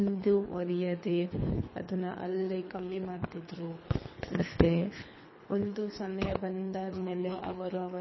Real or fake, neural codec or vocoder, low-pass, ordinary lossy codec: fake; codec, 24 kHz, 3 kbps, HILCodec; 7.2 kHz; MP3, 24 kbps